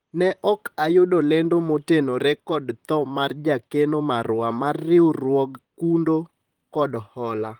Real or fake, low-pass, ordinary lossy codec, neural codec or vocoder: fake; 19.8 kHz; Opus, 24 kbps; vocoder, 44.1 kHz, 128 mel bands, Pupu-Vocoder